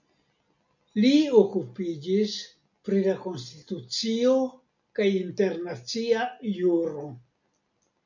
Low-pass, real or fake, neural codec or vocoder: 7.2 kHz; real; none